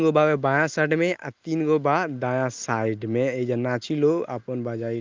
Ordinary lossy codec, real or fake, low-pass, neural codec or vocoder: Opus, 32 kbps; real; 7.2 kHz; none